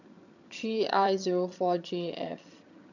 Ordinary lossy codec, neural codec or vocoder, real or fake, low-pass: none; vocoder, 22.05 kHz, 80 mel bands, HiFi-GAN; fake; 7.2 kHz